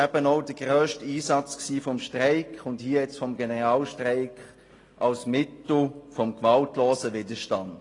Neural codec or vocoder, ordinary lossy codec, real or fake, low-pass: none; AAC, 32 kbps; real; 10.8 kHz